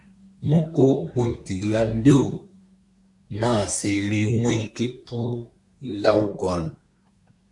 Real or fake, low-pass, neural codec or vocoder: fake; 10.8 kHz; codec, 24 kHz, 1 kbps, SNAC